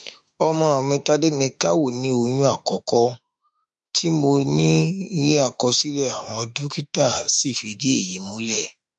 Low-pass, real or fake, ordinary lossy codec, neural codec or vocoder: 10.8 kHz; fake; MP3, 64 kbps; autoencoder, 48 kHz, 32 numbers a frame, DAC-VAE, trained on Japanese speech